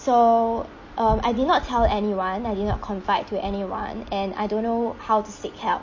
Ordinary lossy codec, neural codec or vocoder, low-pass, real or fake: MP3, 32 kbps; none; 7.2 kHz; real